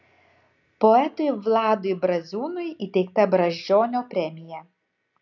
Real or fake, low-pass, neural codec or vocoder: real; 7.2 kHz; none